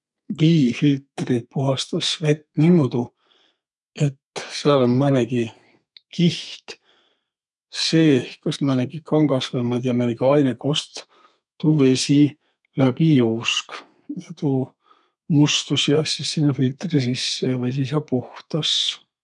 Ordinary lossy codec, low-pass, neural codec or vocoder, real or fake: none; 10.8 kHz; codec, 32 kHz, 1.9 kbps, SNAC; fake